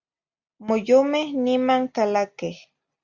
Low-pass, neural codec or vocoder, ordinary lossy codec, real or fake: 7.2 kHz; none; Opus, 64 kbps; real